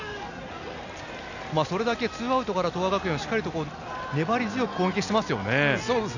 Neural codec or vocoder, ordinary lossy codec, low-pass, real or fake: none; none; 7.2 kHz; real